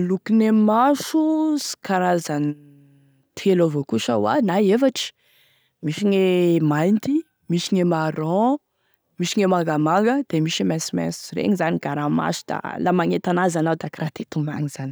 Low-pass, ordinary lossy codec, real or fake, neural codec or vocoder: none; none; real; none